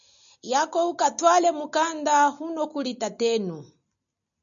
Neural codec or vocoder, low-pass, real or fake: none; 7.2 kHz; real